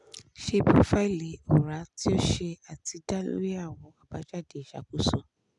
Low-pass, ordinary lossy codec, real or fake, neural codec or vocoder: 10.8 kHz; none; real; none